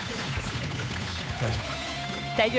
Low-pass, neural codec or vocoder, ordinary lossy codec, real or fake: none; codec, 16 kHz, 8 kbps, FunCodec, trained on Chinese and English, 25 frames a second; none; fake